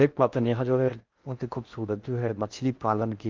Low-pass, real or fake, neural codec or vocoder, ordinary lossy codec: 7.2 kHz; fake; codec, 16 kHz in and 24 kHz out, 0.6 kbps, FocalCodec, streaming, 4096 codes; Opus, 24 kbps